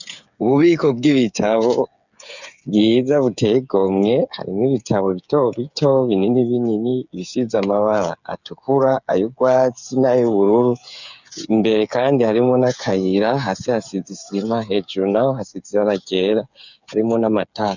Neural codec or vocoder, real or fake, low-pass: codec, 16 kHz, 8 kbps, FreqCodec, smaller model; fake; 7.2 kHz